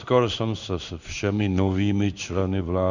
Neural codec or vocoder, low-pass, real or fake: codec, 16 kHz in and 24 kHz out, 1 kbps, XY-Tokenizer; 7.2 kHz; fake